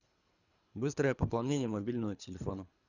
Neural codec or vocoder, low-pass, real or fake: codec, 24 kHz, 3 kbps, HILCodec; 7.2 kHz; fake